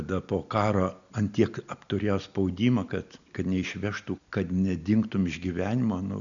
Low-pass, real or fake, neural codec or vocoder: 7.2 kHz; real; none